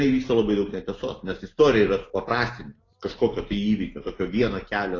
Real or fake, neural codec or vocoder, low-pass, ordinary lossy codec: real; none; 7.2 kHz; AAC, 32 kbps